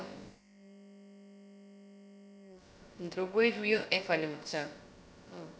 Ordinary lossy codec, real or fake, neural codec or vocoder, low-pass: none; fake; codec, 16 kHz, about 1 kbps, DyCAST, with the encoder's durations; none